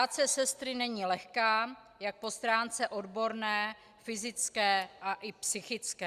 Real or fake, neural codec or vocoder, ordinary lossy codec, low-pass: real; none; Opus, 64 kbps; 14.4 kHz